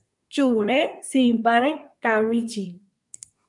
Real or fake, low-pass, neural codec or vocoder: fake; 10.8 kHz; codec, 24 kHz, 1 kbps, SNAC